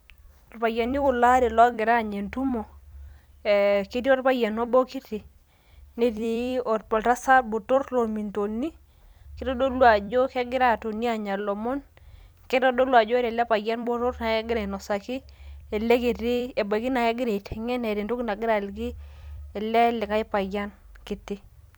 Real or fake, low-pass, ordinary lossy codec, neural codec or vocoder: fake; none; none; vocoder, 44.1 kHz, 128 mel bands every 256 samples, BigVGAN v2